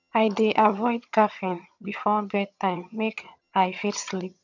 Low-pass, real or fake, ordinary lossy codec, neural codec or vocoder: 7.2 kHz; fake; none; vocoder, 22.05 kHz, 80 mel bands, HiFi-GAN